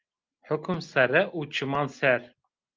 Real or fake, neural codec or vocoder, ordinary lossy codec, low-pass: real; none; Opus, 32 kbps; 7.2 kHz